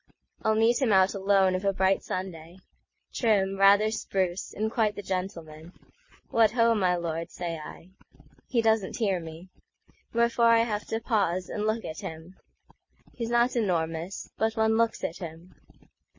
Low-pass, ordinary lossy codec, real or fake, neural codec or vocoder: 7.2 kHz; MP3, 32 kbps; real; none